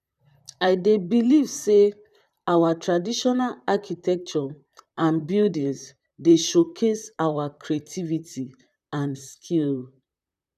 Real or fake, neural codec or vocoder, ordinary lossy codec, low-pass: fake; vocoder, 44.1 kHz, 128 mel bands, Pupu-Vocoder; none; 14.4 kHz